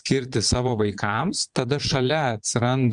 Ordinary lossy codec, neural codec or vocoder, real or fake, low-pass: Opus, 64 kbps; vocoder, 22.05 kHz, 80 mel bands, Vocos; fake; 9.9 kHz